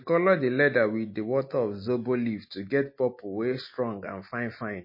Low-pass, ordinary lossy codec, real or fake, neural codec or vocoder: 5.4 kHz; MP3, 24 kbps; real; none